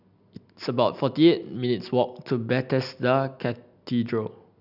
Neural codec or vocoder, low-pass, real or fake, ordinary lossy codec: none; 5.4 kHz; real; none